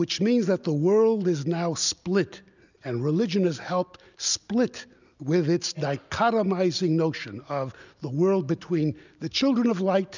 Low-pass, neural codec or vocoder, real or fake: 7.2 kHz; none; real